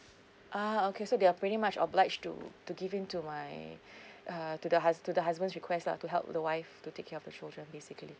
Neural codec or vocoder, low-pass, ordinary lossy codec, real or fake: none; none; none; real